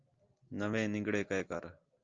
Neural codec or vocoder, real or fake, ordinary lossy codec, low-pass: none; real; Opus, 24 kbps; 7.2 kHz